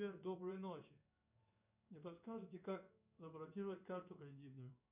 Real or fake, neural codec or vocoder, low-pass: fake; codec, 16 kHz in and 24 kHz out, 1 kbps, XY-Tokenizer; 3.6 kHz